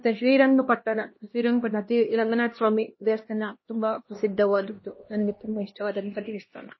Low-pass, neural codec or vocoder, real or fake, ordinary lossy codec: 7.2 kHz; codec, 16 kHz, 1 kbps, X-Codec, HuBERT features, trained on LibriSpeech; fake; MP3, 24 kbps